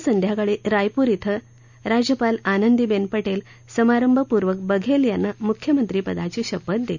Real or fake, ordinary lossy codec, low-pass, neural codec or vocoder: real; none; 7.2 kHz; none